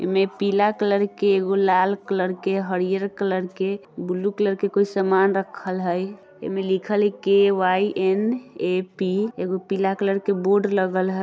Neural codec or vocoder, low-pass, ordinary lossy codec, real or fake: none; none; none; real